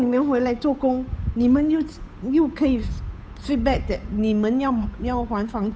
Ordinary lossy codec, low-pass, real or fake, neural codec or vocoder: none; none; fake; codec, 16 kHz, 8 kbps, FunCodec, trained on Chinese and English, 25 frames a second